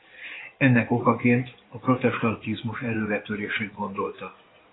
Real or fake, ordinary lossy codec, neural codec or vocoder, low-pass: fake; AAC, 16 kbps; codec, 16 kHz in and 24 kHz out, 2.2 kbps, FireRedTTS-2 codec; 7.2 kHz